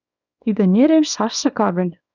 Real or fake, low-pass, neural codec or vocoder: fake; 7.2 kHz; codec, 24 kHz, 0.9 kbps, WavTokenizer, small release